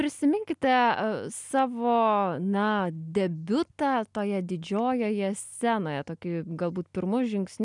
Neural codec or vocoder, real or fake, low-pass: none; real; 10.8 kHz